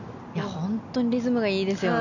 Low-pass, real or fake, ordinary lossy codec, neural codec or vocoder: 7.2 kHz; real; none; none